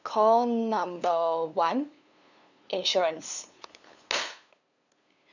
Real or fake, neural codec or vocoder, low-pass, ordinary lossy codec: fake; codec, 16 kHz, 2 kbps, FunCodec, trained on LibriTTS, 25 frames a second; 7.2 kHz; none